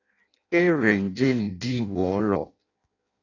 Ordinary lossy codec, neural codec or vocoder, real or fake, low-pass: AAC, 32 kbps; codec, 16 kHz in and 24 kHz out, 0.6 kbps, FireRedTTS-2 codec; fake; 7.2 kHz